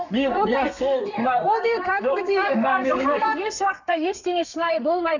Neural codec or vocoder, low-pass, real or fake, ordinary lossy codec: codec, 32 kHz, 1.9 kbps, SNAC; 7.2 kHz; fake; none